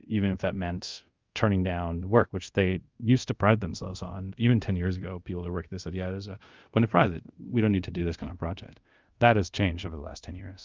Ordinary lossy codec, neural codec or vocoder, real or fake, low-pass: Opus, 24 kbps; codec, 24 kHz, 0.5 kbps, DualCodec; fake; 7.2 kHz